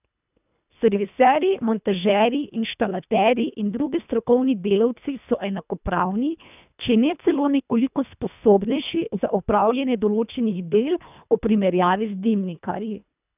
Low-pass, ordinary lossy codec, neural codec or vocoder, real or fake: 3.6 kHz; none; codec, 24 kHz, 1.5 kbps, HILCodec; fake